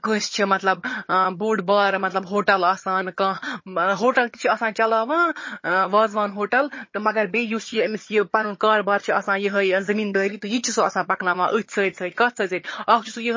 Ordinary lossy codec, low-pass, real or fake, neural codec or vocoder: MP3, 32 kbps; 7.2 kHz; fake; vocoder, 22.05 kHz, 80 mel bands, HiFi-GAN